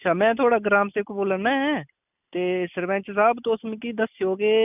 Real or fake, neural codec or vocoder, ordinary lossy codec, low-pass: real; none; none; 3.6 kHz